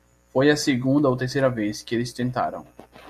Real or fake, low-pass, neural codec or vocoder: real; 14.4 kHz; none